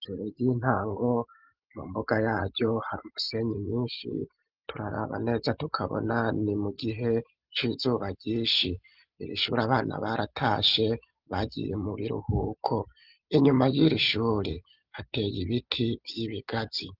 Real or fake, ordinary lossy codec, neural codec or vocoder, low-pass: real; Opus, 32 kbps; none; 5.4 kHz